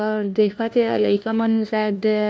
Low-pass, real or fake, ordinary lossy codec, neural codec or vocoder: none; fake; none; codec, 16 kHz, 1 kbps, FunCodec, trained on LibriTTS, 50 frames a second